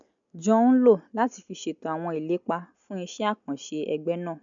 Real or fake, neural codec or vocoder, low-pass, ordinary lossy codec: real; none; 7.2 kHz; MP3, 96 kbps